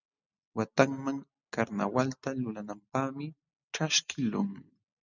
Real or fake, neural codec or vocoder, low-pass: real; none; 7.2 kHz